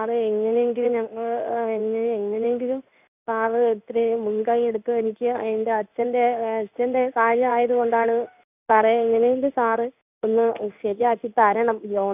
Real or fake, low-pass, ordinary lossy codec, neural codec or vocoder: fake; 3.6 kHz; none; codec, 16 kHz in and 24 kHz out, 1 kbps, XY-Tokenizer